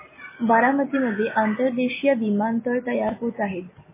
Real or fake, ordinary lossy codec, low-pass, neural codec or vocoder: real; MP3, 16 kbps; 3.6 kHz; none